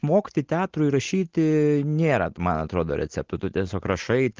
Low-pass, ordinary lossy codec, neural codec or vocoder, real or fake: 7.2 kHz; Opus, 16 kbps; none; real